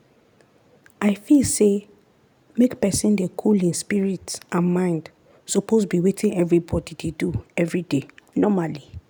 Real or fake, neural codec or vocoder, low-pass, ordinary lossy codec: real; none; none; none